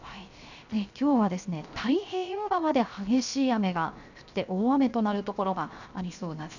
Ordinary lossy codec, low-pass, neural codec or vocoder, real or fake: none; 7.2 kHz; codec, 16 kHz, 0.7 kbps, FocalCodec; fake